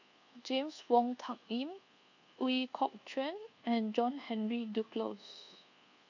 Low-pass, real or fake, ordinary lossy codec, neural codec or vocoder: 7.2 kHz; fake; none; codec, 24 kHz, 1.2 kbps, DualCodec